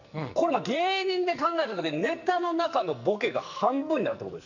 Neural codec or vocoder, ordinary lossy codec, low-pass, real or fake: codec, 44.1 kHz, 2.6 kbps, SNAC; none; 7.2 kHz; fake